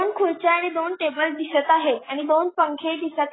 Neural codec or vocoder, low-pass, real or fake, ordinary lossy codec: none; 7.2 kHz; real; AAC, 16 kbps